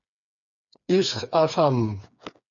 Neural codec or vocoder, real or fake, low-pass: codec, 16 kHz, 4 kbps, FreqCodec, smaller model; fake; 7.2 kHz